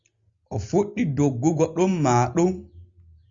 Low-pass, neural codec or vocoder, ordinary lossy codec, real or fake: 7.2 kHz; none; Opus, 64 kbps; real